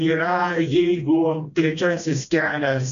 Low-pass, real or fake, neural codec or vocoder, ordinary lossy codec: 7.2 kHz; fake; codec, 16 kHz, 1 kbps, FreqCodec, smaller model; AAC, 96 kbps